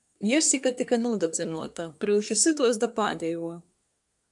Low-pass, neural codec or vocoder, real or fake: 10.8 kHz; codec, 24 kHz, 1 kbps, SNAC; fake